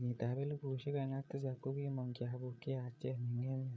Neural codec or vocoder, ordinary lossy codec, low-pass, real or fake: codec, 16 kHz, 8 kbps, FreqCodec, larger model; none; none; fake